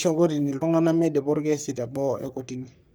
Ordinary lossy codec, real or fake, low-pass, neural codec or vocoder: none; fake; none; codec, 44.1 kHz, 3.4 kbps, Pupu-Codec